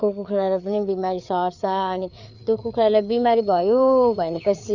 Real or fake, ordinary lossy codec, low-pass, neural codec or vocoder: fake; none; 7.2 kHz; codec, 16 kHz, 4 kbps, FreqCodec, larger model